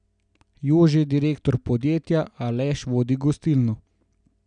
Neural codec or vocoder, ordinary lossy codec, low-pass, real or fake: none; none; 9.9 kHz; real